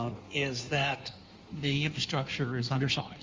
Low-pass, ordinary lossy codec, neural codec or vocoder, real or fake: 7.2 kHz; Opus, 32 kbps; codec, 16 kHz in and 24 kHz out, 1.1 kbps, FireRedTTS-2 codec; fake